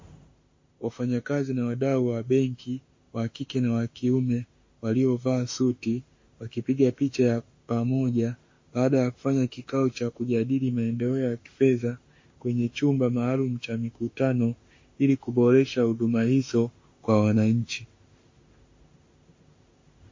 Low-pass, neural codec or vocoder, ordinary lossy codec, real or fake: 7.2 kHz; autoencoder, 48 kHz, 32 numbers a frame, DAC-VAE, trained on Japanese speech; MP3, 32 kbps; fake